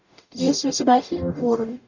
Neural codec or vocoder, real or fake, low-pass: codec, 44.1 kHz, 0.9 kbps, DAC; fake; 7.2 kHz